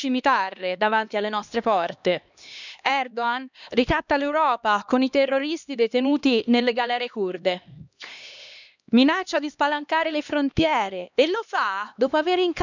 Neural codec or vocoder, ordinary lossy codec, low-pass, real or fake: codec, 16 kHz, 2 kbps, X-Codec, HuBERT features, trained on LibriSpeech; none; 7.2 kHz; fake